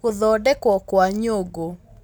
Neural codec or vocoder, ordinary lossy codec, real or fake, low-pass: none; none; real; none